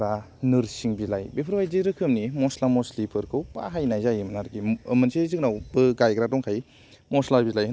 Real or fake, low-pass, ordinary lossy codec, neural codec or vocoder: real; none; none; none